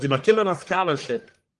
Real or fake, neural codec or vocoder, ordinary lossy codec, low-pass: fake; codec, 44.1 kHz, 1.7 kbps, Pupu-Codec; Opus, 24 kbps; 10.8 kHz